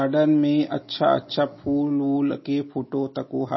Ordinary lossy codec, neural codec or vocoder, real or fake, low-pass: MP3, 24 kbps; none; real; 7.2 kHz